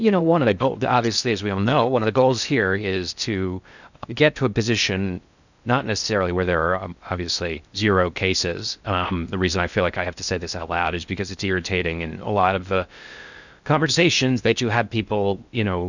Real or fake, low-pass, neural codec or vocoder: fake; 7.2 kHz; codec, 16 kHz in and 24 kHz out, 0.6 kbps, FocalCodec, streaming, 2048 codes